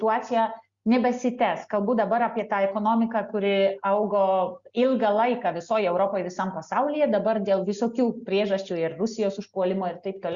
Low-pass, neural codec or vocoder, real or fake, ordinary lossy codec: 7.2 kHz; none; real; Opus, 64 kbps